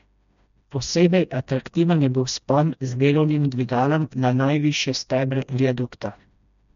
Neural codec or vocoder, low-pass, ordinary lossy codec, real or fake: codec, 16 kHz, 1 kbps, FreqCodec, smaller model; 7.2 kHz; MP3, 64 kbps; fake